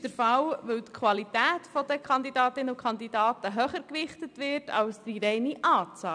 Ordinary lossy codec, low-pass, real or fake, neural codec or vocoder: none; 9.9 kHz; real; none